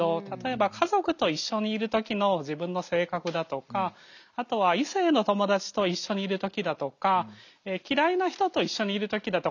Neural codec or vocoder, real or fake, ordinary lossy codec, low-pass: none; real; none; 7.2 kHz